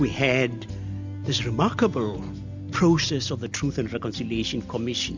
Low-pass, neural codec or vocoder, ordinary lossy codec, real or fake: 7.2 kHz; none; MP3, 64 kbps; real